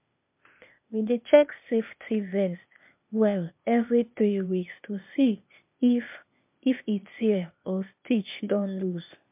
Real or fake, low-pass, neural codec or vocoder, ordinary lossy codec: fake; 3.6 kHz; codec, 16 kHz, 0.8 kbps, ZipCodec; MP3, 32 kbps